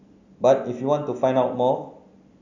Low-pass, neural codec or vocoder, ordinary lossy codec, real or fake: 7.2 kHz; none; none; real